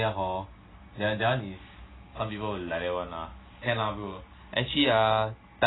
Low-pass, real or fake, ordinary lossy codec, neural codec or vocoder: 7.2 kHz; real; AAC, 16 kbps; none